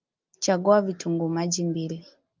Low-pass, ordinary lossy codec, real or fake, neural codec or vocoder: 7.2 kHz; Opus, 32 kbps; real; none